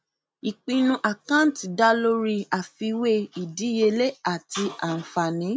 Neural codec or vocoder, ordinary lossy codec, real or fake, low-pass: none; none; real; none